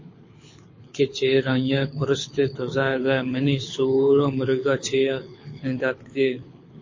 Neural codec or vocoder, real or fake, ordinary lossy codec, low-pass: codec, 24 kHz, 6 kbps, HILCodec; fake; MP3, 32 kbps; 7.2 kHz